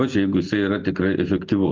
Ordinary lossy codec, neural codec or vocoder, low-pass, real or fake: Opus, 24 kbps; none; 7.2 kHz; real